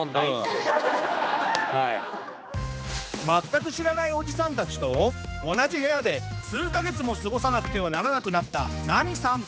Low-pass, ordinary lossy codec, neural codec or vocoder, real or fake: none; none; codec, 16 kHz, 2 kbps, X-Codec, HuBERT features, trained on general audio; fake